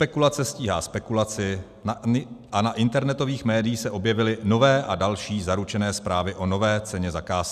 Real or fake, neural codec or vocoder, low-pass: real; none; 14.4 kHz